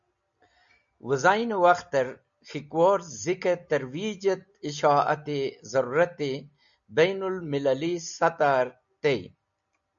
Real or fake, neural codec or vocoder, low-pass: real; none; 7.2 kHz